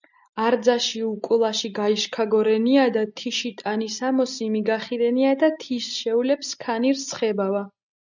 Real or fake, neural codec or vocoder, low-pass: real; none; 7.2 kHz